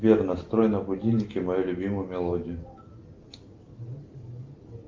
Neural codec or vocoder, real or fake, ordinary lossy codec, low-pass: none; real; Opus, 24 kbps; 7.2 kHz